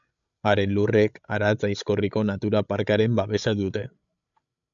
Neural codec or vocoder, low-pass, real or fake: codec, 16 kHz, 16 kbps, FreqCodec, larger model; 7.2 kHz; fake